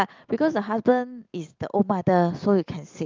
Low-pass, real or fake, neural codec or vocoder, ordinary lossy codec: 7.2 kHz; real; none; Opus, 32 kbps